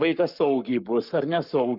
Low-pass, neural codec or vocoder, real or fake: 5.4 kHz; codec, 44.1 kHz, 7.8 kbps, Pupu-Codec; fake